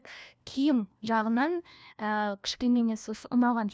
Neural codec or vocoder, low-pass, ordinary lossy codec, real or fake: codec, 16 kHz, 1 kbps, FunCodec, trained on LibriTTS, 50 frames a second; none; none; fake